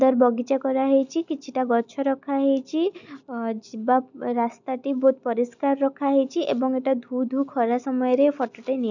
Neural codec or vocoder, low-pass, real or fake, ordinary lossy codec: none; 7.2 kHz; real; none